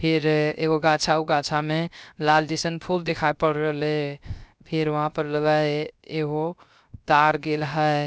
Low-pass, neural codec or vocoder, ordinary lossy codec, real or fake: none; codec, 16 kHz, 0.3 kbps, FocalCodec; none; fake